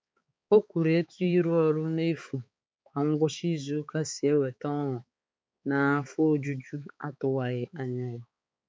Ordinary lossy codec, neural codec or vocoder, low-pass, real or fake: none; codec, 16 kHz, 4 kbps, X-Codec, HuBERT features, trained on balanced general audio; none; fake